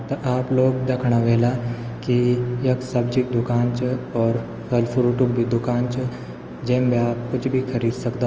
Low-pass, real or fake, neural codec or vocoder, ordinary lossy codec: 7.2 kHz; real; none; Opus, 24 kbps